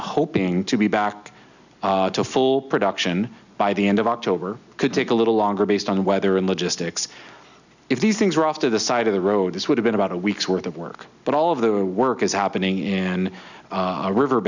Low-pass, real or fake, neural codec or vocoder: 7.2 kHz; real; none